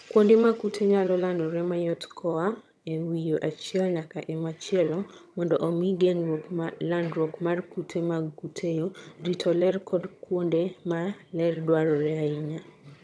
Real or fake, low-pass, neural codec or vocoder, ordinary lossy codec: fake; none; vocoder, 22.05 kHz, 80 mel bands, HiFi-GAN; none